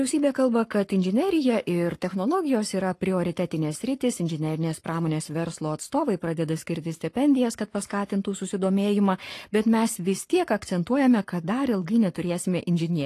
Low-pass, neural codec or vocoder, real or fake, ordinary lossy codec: 14.4 kHz; codec, 44.1 kHz, 7.8 kbps, Pupu-Codec; fake; AAC, 48 kbps